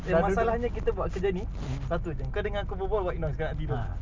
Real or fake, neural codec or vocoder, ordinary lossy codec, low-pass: real; none; Opus, 24 kbps; 7.2 kHz